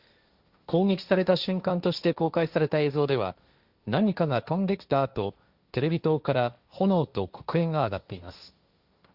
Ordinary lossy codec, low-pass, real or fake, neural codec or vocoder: Opus, 64 kbps; 5.4 kHz; fake; codec, 16 kHz, 1.1 kbps, Voila-Tokenizer